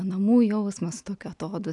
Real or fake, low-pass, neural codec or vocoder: real; 10.8 kHz; none